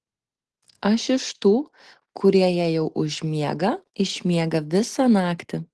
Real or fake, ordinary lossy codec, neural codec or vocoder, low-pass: real; Opus, 16 kbps; none; 10.8 kHz